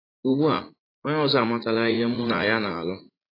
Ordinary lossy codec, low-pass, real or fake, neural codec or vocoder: AAC, 24 kbps; 5.4 kHz; fake; vocoder, 44.1 kHz, 80 mel bands, Vocos